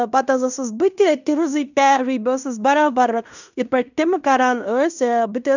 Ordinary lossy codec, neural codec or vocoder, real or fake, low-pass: none; codec, 16 kHz in and 24 kHz out, 0.9 kbps, LongCat-Audio-Codec, fine tuned four codebook decoder; fake; 7.2 kHz